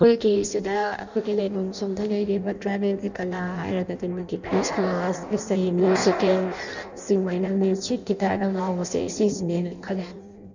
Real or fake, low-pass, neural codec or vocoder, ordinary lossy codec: fake; 7.2 kHz; codec, 16 kHz in and 24 kHz out, 0.6 kbps, FireRedTTS-2 codec; none